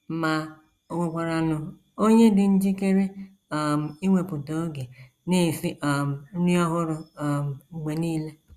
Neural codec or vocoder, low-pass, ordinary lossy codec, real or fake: none; 14.4 kHz; Opus, 64 kbps; real